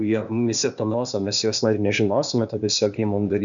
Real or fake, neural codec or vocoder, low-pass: fake; codec, 16 kHz, 0.8 kbps, ZipCodec; 7.2 kHz